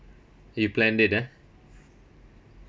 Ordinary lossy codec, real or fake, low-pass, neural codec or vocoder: none; real; none; none